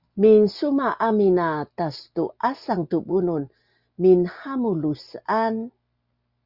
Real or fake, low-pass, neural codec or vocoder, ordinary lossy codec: real; 5.4 kHz; none; Opus, 64 kbps